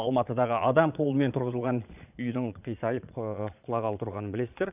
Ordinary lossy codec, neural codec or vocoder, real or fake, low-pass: none; vocoder, 22.05 kHz, 80 mel bands, Vocos; fake; 3.6 kHz